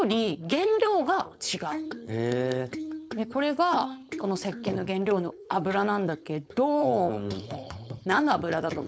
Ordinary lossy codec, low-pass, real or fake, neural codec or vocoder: none; none; fake; codec, 16 kHz, 4.8 kbps, FACodec